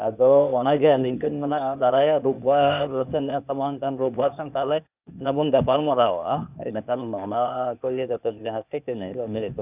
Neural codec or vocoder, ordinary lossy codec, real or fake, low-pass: codec, 16 kHz, 0.8 kbps, ZipCodec; none; fake; 3.6 kHz